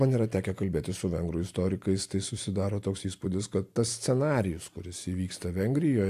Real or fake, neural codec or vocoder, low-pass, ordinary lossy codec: real; none; 14.4 kHz; AAC, 64 kbps